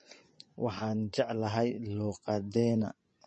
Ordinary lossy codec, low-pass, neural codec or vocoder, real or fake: MP3, 32 kbps; 10.8 kHz; vocoder, 24 kHz, 100 mel bands, Vocos; fake